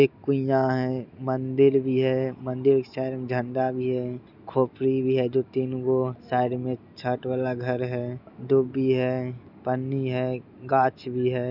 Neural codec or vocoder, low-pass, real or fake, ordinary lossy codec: none; 5.4 kHz; real; none